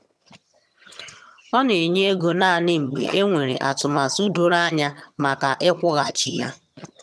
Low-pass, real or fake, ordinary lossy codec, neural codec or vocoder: none; fake; none; vocoder, 22.05 kHz, 80 mel bands, HiFi-GAN